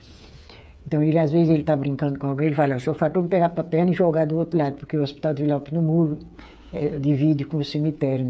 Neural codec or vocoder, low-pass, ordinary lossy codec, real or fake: codec, 16 kHz, 8 kbps, FreqCodec, smaller model; none; none; fake